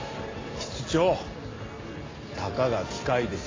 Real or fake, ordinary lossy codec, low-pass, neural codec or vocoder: real; AAC, 32 kbps; 7.2 kHz; none